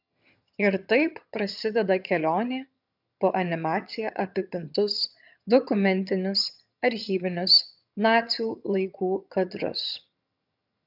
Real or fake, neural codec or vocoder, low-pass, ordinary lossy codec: fake; vocoder, 22.05 kHz, 80 mel bands, HiFi-GAN; 5.4 kHz; AAC, 48 kbps